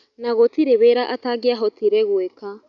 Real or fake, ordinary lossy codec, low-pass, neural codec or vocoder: real; none; 7.2 kHz; none